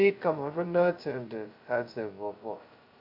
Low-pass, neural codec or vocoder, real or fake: 5.4 kHz; codec, 16 kHz, 0.2 kbps, FocalCodec; fake